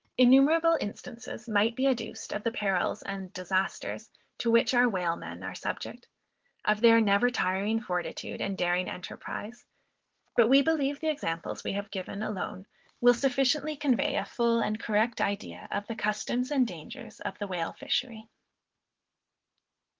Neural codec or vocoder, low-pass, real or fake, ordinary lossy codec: none; 7.2 kHz; real; Opus, 16 kbps